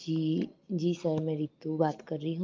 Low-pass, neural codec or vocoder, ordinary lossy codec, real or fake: 7.2 kHz; none; Opus, 24 kbps; real